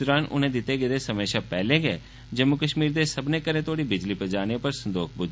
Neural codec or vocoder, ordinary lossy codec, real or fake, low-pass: none; none; real; none